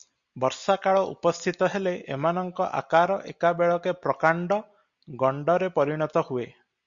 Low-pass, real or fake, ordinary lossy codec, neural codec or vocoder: 7.2 kHz; real; Opus, 64 kbps; none